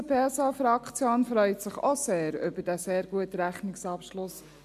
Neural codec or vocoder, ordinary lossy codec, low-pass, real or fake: none; AAC, 96 kbps; 14.4 kHz; real